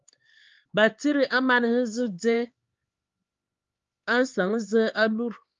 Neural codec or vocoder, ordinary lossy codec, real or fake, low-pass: codec, 16 kHz, 4 kbps, X-Codec, HuBERT features, trained on LibriSpeech; Opus, 32 kbps; fake; 7.2 kHz